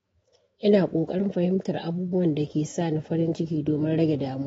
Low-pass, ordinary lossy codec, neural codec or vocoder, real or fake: 19.8 kHz; AAC, 24 kbps; autoencoder, 48 kHz, 128 numbers a frame, DAC-VAE, trained on Japanese speech; fake